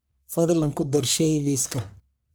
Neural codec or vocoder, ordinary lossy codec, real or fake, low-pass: codec, 44.1 kHz, 1.7 kbps, Pupu-Codec; none; fake; none